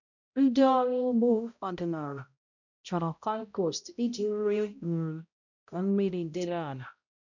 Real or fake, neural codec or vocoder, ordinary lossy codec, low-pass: fake; codec, 16 kHz, 0.5 kbps, X-Codec, HuBERT features, trained on balanced general audio; none; 7.2 kHz